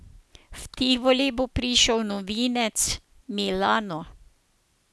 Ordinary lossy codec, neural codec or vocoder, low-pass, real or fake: none; none; none; real